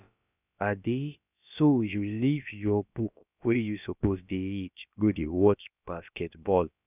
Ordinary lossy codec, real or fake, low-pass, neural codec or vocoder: none; fake; 3.6 kHz; codec, 16 kHz, about 1 kbps, DyCAST, with the encoder's durations